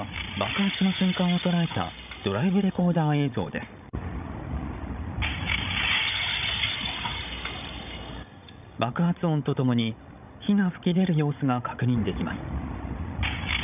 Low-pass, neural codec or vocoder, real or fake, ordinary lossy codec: 3.6 kHz; codec, 16 kHz, 16 kbps, FreqCodec, larger model; fake; none